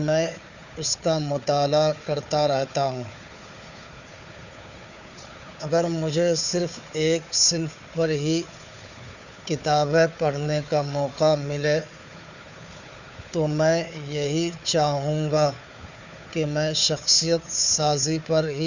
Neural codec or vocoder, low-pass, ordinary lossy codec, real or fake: codec, 16 kHz, 4 kbps, FunCodec, trained on Chinese and English, 50 frames a second; 7.2 kHz; none; fake